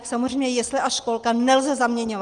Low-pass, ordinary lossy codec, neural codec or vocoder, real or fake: 9.9 kHz; Opus, 24 kbps; none; real